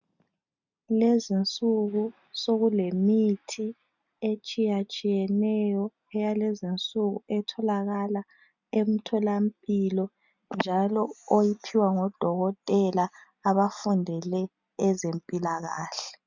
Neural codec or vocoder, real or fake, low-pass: none; real; 7.2 kHz